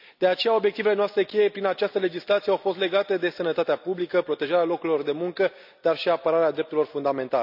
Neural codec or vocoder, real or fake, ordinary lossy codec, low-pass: none; real; none; 5.4 kHz